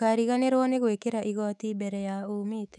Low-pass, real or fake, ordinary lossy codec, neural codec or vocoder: 10.8 kHz; fake; none; autoencoder, 48 kHz, 128 numbers a frame, DAC-VAE, trained on Japanese speech